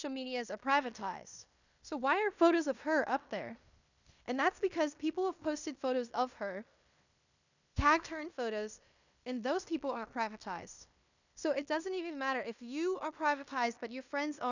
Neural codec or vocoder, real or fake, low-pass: codec, 16 kHz in and 24 kHz out, 0.9 kbps, LongCat-Audio-Codec, fine tuned four codebook decoder; fake; 7.2 kHz